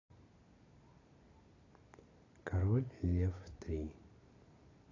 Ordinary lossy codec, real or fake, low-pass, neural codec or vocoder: AAC, 32 kbps; real; 7.2 kHz; none